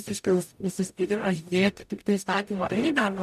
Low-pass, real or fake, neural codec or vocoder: 14.4 kHz; fake; codec, 44.1 kHz, 0.9 kbps, DAC